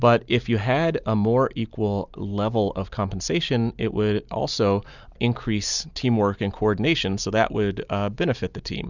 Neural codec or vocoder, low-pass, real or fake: none; 7.2 kHz; real